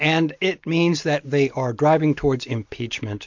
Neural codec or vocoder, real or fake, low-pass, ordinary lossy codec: none; real; 7.2 kHz; MP3, 48 kbps